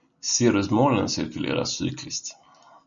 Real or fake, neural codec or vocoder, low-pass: real; none; 7.2 kHz